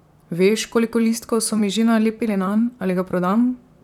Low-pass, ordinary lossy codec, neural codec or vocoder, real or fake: 19.8 kHz; none; vocoder, 44.1 kHz, 128 mel bands, Pupu-Vocoder; fake